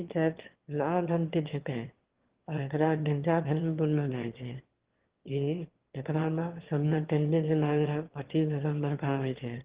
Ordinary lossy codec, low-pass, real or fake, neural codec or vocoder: Opus, 16 kbps; 3.6 kHz; fake; autoencoder, 22.05 kHz, a latent of 192 numbers a frame, VITS, trained on one speaker